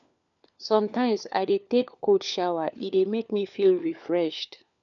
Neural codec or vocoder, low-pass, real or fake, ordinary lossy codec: codec, 16 kHz, 4 kbps, FunCodec, trained on LibriTTS, 50 frames a second; 7.2 kHz; fake; none